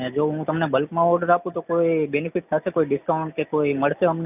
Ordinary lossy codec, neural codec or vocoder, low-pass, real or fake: none; none; 3.6 kHz; real